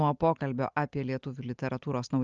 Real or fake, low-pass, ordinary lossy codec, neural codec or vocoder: real; 7.2 kHz; Opus, 24 kbps; none